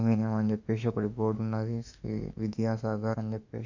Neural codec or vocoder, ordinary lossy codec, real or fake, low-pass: autoencoder, 48 kHz, 32 numbers a frame, DAC-VAE, trained on Japanese speech; none; fake; 7.2 kHz